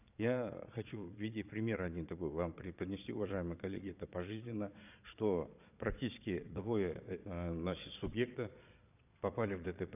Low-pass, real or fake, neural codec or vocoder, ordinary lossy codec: 3.6 kHz; fake; vocoder, 22.05 kHz, 80 mel bands, Vocos; none